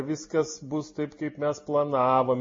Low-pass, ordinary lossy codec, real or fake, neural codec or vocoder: 7.2 kHz; MP3, 32 kbps; real; none